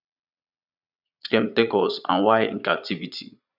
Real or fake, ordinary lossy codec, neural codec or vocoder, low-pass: fake; none; vocoder, 22.05 kHz, 80 mel bands, Vocos; 5.4 kHz